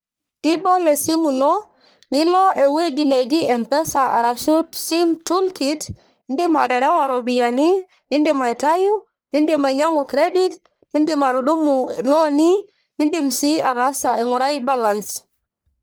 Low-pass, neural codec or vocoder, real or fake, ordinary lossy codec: none; codec, 44.1 kHz, 1.7 kbps, Pupu-Codec; fake; none